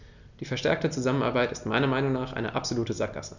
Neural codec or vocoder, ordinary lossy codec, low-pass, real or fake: none; none; 7.2 kHz; real